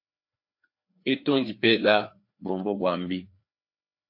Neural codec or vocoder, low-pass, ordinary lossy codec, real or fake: codec, 16 kHz, 2 kbps, FreqCodec, larger model; 5.4 kHz; MP3, 32 kbps; fake